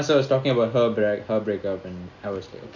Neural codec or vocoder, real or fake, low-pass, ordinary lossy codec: none; real; 7.2 kHz; none